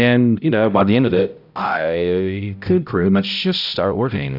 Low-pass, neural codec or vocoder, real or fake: 5.4 kHz; codec, 16 kHz, 0.5 kbps, X-Codec, HuBERT features, trained on balanced general audio; fake